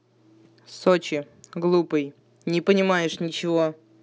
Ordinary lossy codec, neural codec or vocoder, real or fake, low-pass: none; none; real; none